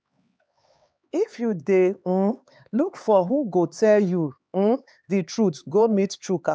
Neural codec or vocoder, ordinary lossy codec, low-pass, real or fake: codec, 16 kHz, 4 kbps, X-Codec, HuBERT features, trained on LibriSpeech; none; none; fake